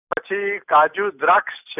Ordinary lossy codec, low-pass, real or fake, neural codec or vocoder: none; 3.6 kHz; real; none